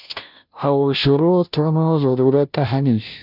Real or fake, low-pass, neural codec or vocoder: fake; 5.4 kHz; codec, 16 kHz, 0.5 kbps, FunCodec, trained on Chinese and English, 25 frames a second